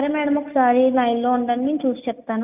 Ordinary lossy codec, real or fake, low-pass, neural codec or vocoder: none; real; 3.6 kHz; none